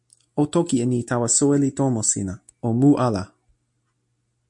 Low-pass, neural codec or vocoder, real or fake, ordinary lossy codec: 10.8 kHz; none; real; MP3, 64 kbps